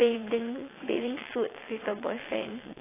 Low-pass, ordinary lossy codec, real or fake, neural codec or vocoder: 3.6 kHz; AAC, 16 kbps; fake; vocoder, 22.05 kHz, 80 mel bands, WaveNeXt